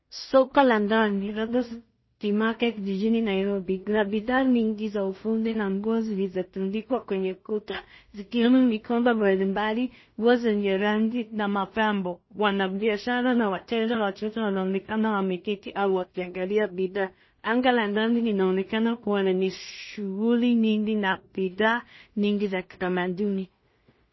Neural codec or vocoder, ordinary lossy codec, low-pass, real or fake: codec, 16 kHz in and 24 kHz out, 0.4 kbps, LongCat-Audio-Codec, two codebook decoder; MP3, 24 kbps; 7.2 kHz; fake